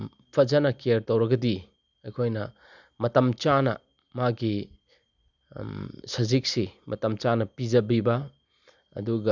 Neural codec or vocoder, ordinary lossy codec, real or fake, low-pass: none; none; real; 7.2 kHz